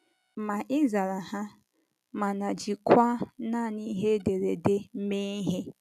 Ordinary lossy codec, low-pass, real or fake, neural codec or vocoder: none; 14.4 kHz; real; none